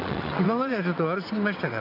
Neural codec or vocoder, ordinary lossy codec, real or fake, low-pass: vocoder, 22.05 kHz, 80 mel bands, WaveNeXt; none; fake; 5.4 kHz